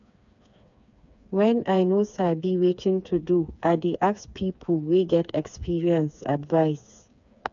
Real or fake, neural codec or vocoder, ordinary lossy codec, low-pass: fake; codec, 16 kHz, 4 kbps, FreqCodec, smaller model; none; 7.2 kHz